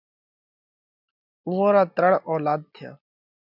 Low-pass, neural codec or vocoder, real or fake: 5.4 kHz; none; real